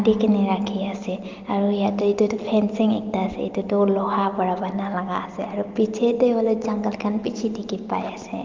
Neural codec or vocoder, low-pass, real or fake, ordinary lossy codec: none; 7.2 kHz; real; Opus, 32 kbps